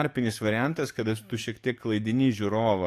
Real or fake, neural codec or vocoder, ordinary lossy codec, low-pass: fake; codec, 44.1 kHz, 7.8 kbps, DAC; AAC, 64 kbps; 14.4 kHz